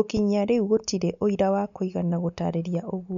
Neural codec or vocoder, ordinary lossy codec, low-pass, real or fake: none; none; 7.2 kHz; real